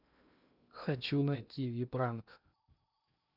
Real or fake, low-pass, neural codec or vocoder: fake; 5.4 kHz; codec, 16 kHz in and 24 kHz out, 0.8 kbps, FocalCodec, streaming, 65536 codes